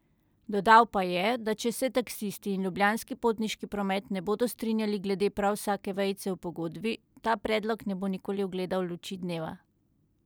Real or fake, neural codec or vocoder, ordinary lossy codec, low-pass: real; none; none; none